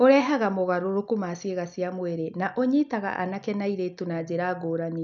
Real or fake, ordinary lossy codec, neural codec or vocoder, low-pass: real; none; none; 7.2 kHz